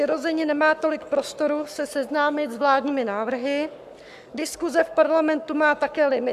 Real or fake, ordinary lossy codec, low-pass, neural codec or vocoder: fake; AAC, 96 kbps; 14.4 kHz; codec, 44.1 kHz, 7.8 kbps, Pupu-Codec